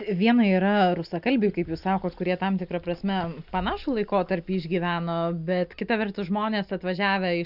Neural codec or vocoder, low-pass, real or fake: none; 5.4 kHz; real